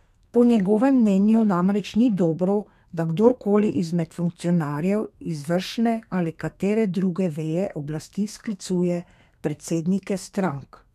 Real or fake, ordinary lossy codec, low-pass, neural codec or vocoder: fake; none; 14.4 kHz; codec, 32 kHz, 1.9 kbps, SNAC